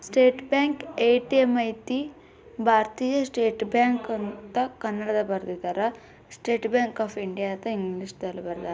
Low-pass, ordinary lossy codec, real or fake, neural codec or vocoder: none; none; real; none